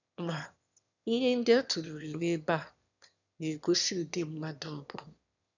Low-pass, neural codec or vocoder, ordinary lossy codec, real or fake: 7.2 kHz; autoencoder, 22.05 kHz, a latent of 192 numbers a frame, VITS, trained on one speaker; none; fake